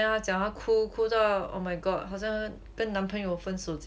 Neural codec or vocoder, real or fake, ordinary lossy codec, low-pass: none; real; none; none